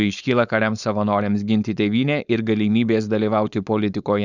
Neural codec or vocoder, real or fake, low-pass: codec, 16 kHz, 4.8 kbps, FACodec; fake; 7.2 kHz